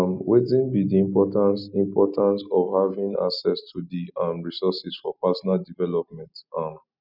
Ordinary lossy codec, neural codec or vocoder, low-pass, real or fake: none; none; 5.4 kHz; real